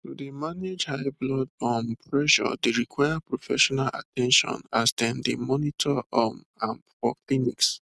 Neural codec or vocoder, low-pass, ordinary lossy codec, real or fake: none; none; none; real